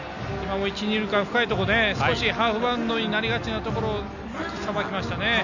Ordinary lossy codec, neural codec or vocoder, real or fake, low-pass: none; none; real; 7.2 kHz